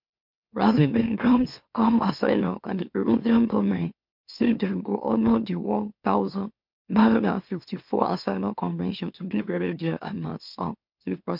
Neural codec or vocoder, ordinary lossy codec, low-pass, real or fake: autoencoder, 44.1 kHz, a latent of 192 numbers a frame, MeloTTS; MP3, 48 kbps; 5.4 kHz; fake